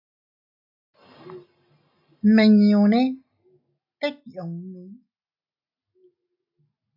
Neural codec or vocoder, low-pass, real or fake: none; 5.4 kHz; real